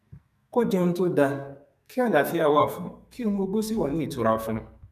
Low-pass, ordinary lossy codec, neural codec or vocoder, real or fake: 14.4 kHz; none; codec, 44.1 kHz, 2.6 kbps, SNAC; fake